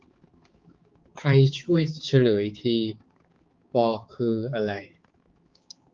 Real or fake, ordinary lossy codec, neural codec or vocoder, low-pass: fake; Opus, 32 kbps; codec, 16 kHz, 4 kbps, X-Codec, HuBERT features, trained on balanced general audio; 7.2 kHz